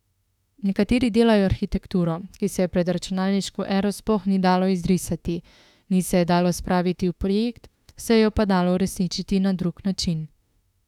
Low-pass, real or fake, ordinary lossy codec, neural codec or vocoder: 19.8 kHz; fake; none; autoencoder, 48 kHz, 32 numbers a frame, DAC-VAE, trained on Japanese speech